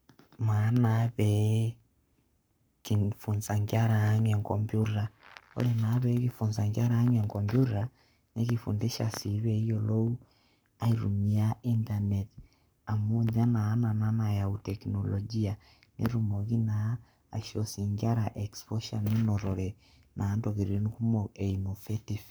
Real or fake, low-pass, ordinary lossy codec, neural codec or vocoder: fake; none; none; codec, 44.1 kHz, 7.8 kbps, Pupu-Codec